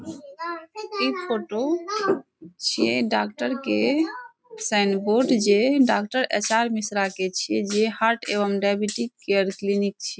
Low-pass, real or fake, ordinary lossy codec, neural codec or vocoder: none; real; none; none